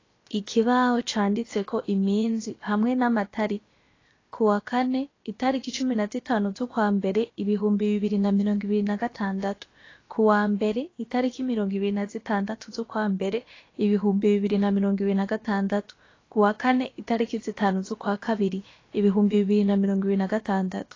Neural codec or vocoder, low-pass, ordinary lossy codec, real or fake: codec, 16 kHz, about 1 kbps, DyCAST, with the encoder's durations; 7.2 kHz; AAC, 32 kbps; fake